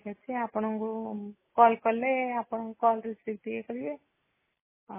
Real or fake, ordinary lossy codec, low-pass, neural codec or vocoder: real; MP3, 16 kbps; 3.6 kHz; none